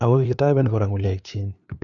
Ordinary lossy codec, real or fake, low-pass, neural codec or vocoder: none; fake; 7.2 kHz; codec, 16 kHz, 2 kbps, FunCodec, trained on LibriTTS, 25 frames a second